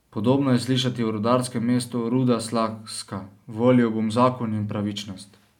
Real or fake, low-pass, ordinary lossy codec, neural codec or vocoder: real; 19.8 kHz; none; none